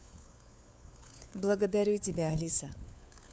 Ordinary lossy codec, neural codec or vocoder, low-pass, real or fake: none; codec, 16 kHz, 4 kbps, FunCodec, trained on LibriTTS, 50 frames a second; none; fake